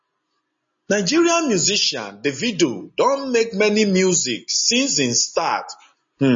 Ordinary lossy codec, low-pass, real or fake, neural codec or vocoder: MP3, 32 kbps; 7.2 kHz; real; none